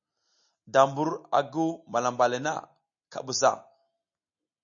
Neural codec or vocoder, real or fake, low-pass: none; real; 7.2 kHz